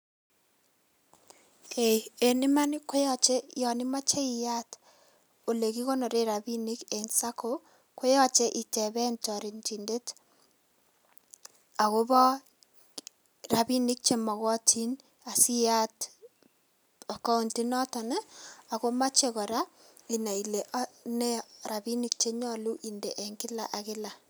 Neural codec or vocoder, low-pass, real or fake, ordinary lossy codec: none; none; real; none